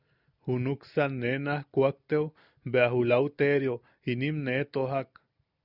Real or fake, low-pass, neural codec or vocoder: real; 5.4 kHz; none